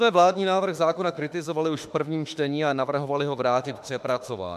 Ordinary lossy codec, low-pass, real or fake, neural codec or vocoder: MP3, 96 kbps; 14.4 kHz; fake; autoencoder, 48 kHz, 32 numbers a frame, DAC-VAE, trained on Japanese speech